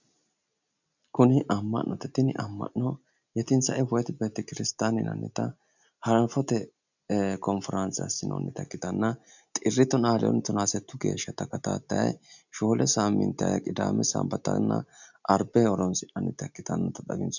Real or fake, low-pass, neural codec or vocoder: real; 7.2 kHz; none